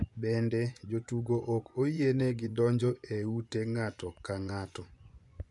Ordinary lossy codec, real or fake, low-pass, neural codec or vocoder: none; fake; 10.8 kHz; vocoder, 24 kHz, 100 mel bands, Vocos